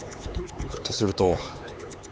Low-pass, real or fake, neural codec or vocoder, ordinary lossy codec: none; fake; codec, 16 kHz, 4 kbps, X-Codec, HuBERT features, trained on LibriSpeech; none